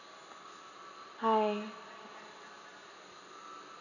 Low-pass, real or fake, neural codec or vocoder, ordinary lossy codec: 7.2 kHz; real; none; none